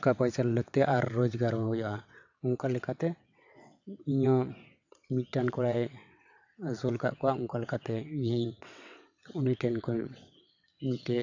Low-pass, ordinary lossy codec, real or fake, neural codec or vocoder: 7.2 kHz; AAC, 48 kbps; fake; vocoder, 22.05 kHz, 80 mel bands, WaveNeXt